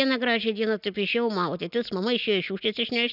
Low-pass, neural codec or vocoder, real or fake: 5.4 kHz; none; real